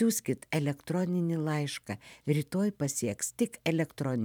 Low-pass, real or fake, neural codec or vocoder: 19.8 kHz; fake; vocoder, 44.1 kHz, 128 mel bands every 512 samples, BigVGAN v2